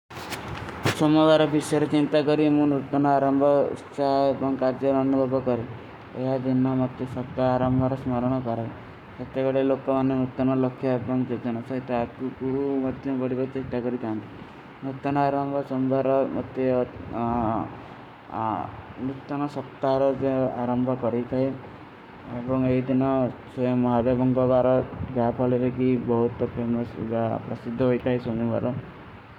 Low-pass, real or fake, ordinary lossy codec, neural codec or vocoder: 19.8 kHz; fake; none; codec, 44.1 kHz, 7.8 kbps, Pupu-Codec